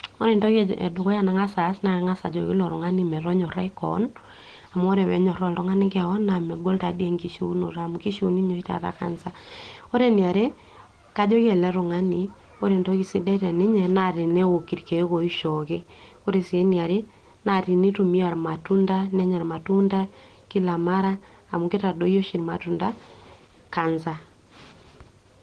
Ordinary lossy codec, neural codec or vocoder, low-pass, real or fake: Opus, 16 kbps; none; 9.9 kHz; real